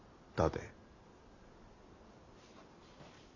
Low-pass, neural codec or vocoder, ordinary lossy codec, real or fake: 7.2 kHz; none; none; real